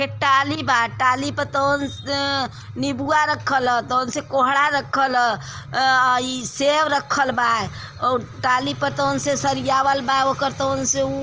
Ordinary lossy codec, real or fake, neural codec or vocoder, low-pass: Opus, 24 kbps; real; none; 7.2 kHz